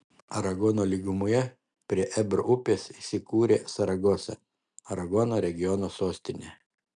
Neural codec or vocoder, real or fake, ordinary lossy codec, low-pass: none; real; MP3, 96 kbps; 9.9 kHz